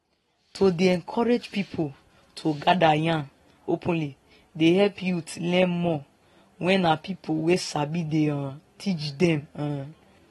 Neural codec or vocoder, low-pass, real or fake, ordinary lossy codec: none; 19.8 kHz; real; AAC, 32 kbps